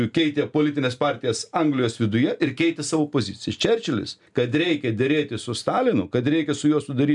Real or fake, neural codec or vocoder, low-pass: real; none; 10.8 kHz